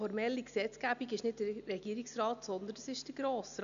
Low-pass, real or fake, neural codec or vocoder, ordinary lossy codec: 7.2 kHz; real; none; none